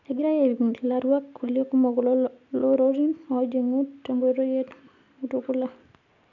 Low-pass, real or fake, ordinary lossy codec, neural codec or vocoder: 7.2 kHz; real; none; none